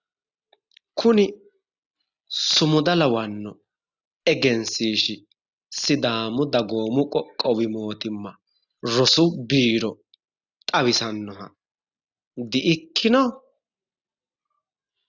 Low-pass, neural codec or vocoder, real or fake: 7.2 kHz; none; real